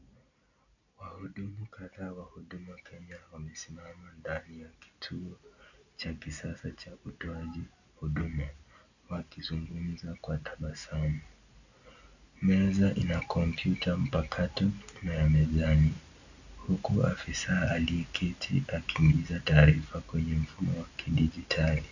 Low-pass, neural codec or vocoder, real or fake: 7.2 kHz; none; real